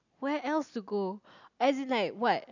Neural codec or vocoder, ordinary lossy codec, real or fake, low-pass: none; none; real; 7.2 kHz